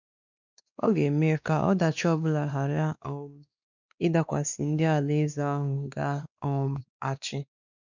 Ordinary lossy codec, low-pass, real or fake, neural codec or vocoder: none; 7.2 kHz; fake; codec, 16 kHz, 2 kbps, X-Codec, WavLM features, trained on Multilingual LibriSpeech